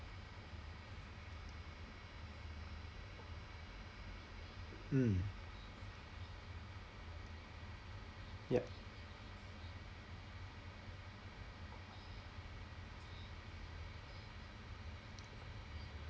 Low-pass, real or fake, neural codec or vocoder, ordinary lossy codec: none; real; none; none